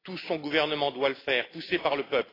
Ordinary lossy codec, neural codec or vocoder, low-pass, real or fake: AAC, 24 kbps; none; 5.4 kHz; real